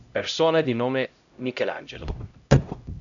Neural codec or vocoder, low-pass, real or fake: codec, 16 kHz, 0.5 kbps, X-Codec, HuBERT features, trained on LibriSpeech; 7.2 kHz; fake